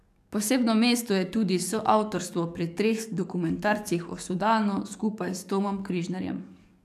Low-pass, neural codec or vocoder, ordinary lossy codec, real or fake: 14.4 kHz; codec, 44.1 kHz, 7.8 kbps, DAC; none; fake